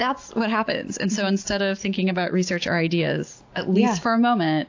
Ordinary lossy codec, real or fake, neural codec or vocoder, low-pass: AAC, 48 kbps; real; none; 7.2 kHz